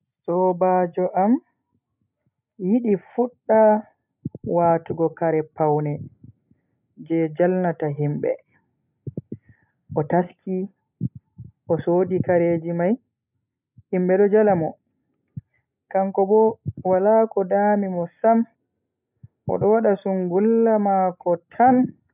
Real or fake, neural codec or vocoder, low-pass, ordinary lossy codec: real; none; 3.6 kHz; none